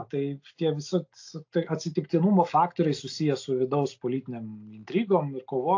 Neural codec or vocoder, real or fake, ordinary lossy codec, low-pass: none; real; AAC, 48 kbps; 7.2 kHz